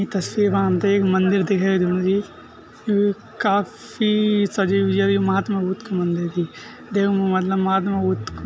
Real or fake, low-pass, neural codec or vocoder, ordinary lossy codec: real; none; none; none